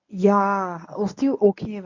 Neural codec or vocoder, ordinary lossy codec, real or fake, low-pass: codec, 24 kHz, 0.9 kbps, WavTokenizer, medium speech release version 1; none; fake; 7.2 kHz